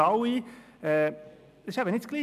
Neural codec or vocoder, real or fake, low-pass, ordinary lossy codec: none; real; 14.4 kHz; none